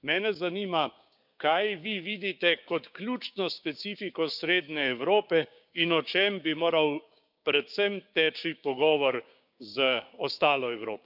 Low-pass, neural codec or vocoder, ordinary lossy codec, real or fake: 5.4 kHz; codec, 16 kHz, 6 kbps, DAC; none; fake